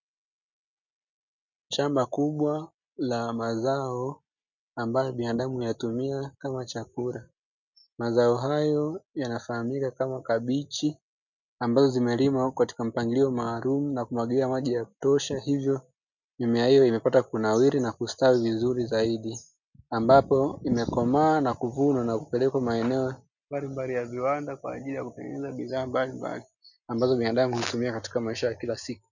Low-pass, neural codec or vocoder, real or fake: 7.2 kHz; none; real